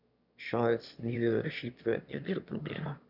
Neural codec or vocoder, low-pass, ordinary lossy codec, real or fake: autoencoder, 22.05 kHz, a latent of 192 numbers a frame, VITS, trained on one speaker; 5.4 kHz; none; fake